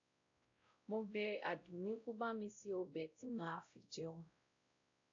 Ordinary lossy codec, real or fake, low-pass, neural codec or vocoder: AAC, 48 kbps; fake; 7.2 kHz; codec, 16 kHz, 0.5 kbps, X-Codec, WavLM features, trained on Multilingual LibriSpeech